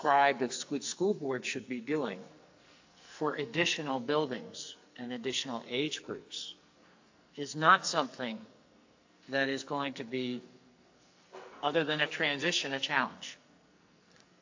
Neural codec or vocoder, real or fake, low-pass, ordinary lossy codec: codec, 44.1 kHz, 2.6 kbps, SNAC; fake; 7.2 kHz; AAC, 48 kbps